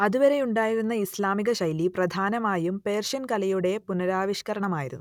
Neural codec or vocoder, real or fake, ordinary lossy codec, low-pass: none; real; none; 19.8 kHz